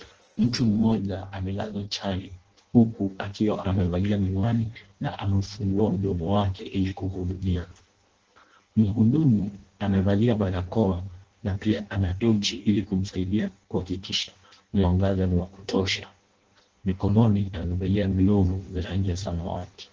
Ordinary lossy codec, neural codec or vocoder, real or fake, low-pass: Opus, 16 kbps; codec, 16 kHz in and 24 kHz out, 0.6 kbps, FireRedTTS-2 codec; fake; 7.2 kHz